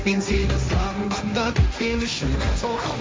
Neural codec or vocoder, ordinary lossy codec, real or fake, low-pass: codec, 16 kHz, 1.1 kbps, Voila-Tokenizer; none; fake; none